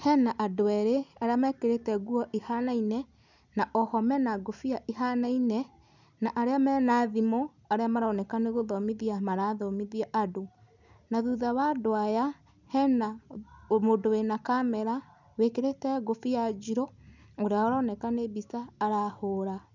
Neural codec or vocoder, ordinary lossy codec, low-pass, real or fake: none; none; 7.2 kHz; real